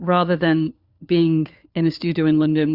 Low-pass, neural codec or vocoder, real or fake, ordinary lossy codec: 5.4 kHz; codec, 16 kHz, 4 kbps, FunCodec, trained on LibriTTS, 50 frames a second; fake; Opus, 64 kbps